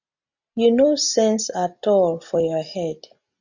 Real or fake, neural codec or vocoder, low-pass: real; none; 7.2 kHz